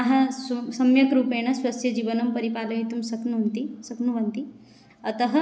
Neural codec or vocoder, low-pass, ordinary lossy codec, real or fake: none; none; none; real